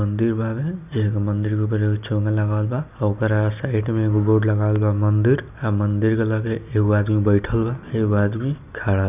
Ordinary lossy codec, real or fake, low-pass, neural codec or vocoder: none; real; 3.6 kHz; none